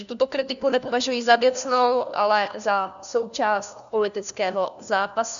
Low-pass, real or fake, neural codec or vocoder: 7.2 kHz; fake; codec, 16 kHz, 1 kbps, FunCodec, trained on LibriTTS, 50 frames a second